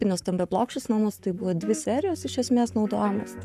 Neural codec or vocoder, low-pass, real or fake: codec, 44.1 kHz, 7.8 kbps, Pupu-Codec; 14.4 kHz; fake